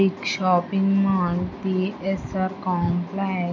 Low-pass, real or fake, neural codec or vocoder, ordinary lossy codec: 7.2 kHz; real; none; none